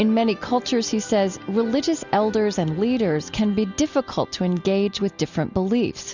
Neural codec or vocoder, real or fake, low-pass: none; real; 7.2 kHz